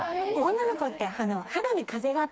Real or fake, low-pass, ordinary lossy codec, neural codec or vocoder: fake; none; none; codec, 16 kHz, 2 kbps, FreqCodec, smaller model